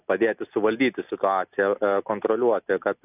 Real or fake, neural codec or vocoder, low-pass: real; none; 3.6 kHz